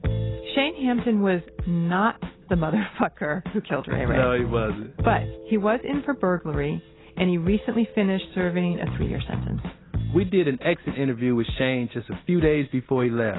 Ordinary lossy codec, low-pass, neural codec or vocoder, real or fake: AAC, 16 kbps; 7.2 kHz; none; real